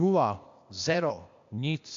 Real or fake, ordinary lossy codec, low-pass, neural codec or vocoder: fake; AAC, 64 kbps; 7.2 kHz; codec, 16 kHz, 0.8 kbps, ZipCodec